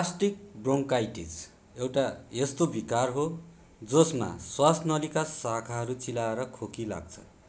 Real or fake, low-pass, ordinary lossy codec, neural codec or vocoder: real; none; none; none